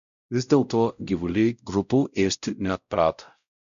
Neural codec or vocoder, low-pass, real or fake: codec, 16 kHz, 0.5 kbps, X-Codec, WavLM features, trained on Multilingual LibriSpeech; 7.2 kHz; fake